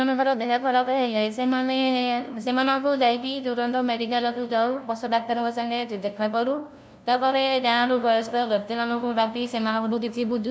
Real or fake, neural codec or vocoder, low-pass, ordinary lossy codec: fake; codec, 16 kHz, 0.5 kbps, FunCodec, trained on LibriTTS, 25 frames a second; none; none